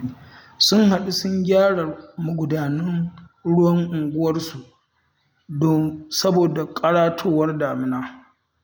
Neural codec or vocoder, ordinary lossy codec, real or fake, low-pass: none; none; real; none